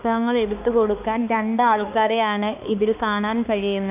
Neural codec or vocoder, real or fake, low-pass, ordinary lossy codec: codec, 16 kHz, 2 kbps, X-Codec, HuBERT features, trained on balanced general audio; fake; 3.6 kHz; none